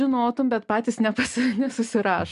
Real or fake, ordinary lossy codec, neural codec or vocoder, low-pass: real; MP3, 96 kbps; none; 10.8 kHz